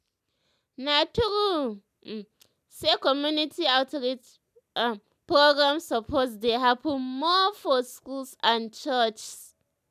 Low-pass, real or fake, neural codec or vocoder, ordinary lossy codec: 14.4 kHz; real; none; none